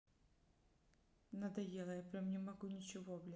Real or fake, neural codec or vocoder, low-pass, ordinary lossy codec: real; none; none; none